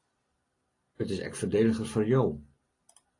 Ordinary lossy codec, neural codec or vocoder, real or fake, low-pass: AAC, 32 kbps; none; real; 10.8 kHz